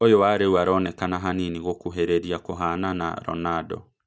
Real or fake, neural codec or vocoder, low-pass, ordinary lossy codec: real; none; none; none